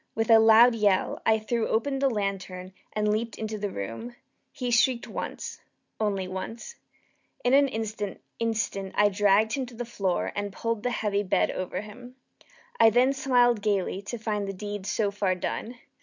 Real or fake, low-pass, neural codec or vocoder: real; 7.2 kHz; none